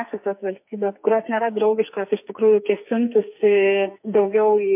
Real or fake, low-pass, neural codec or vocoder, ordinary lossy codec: fake; 3.6 kHz; codec, 44.1 kHz, 2.6 kbps, SNAC; MP3, 32 kbps